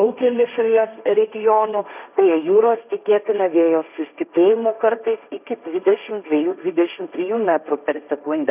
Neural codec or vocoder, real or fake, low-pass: codec, 16 kHz, 1.1 kbps, Voila-Tokenizer; fake; 3.6 kHz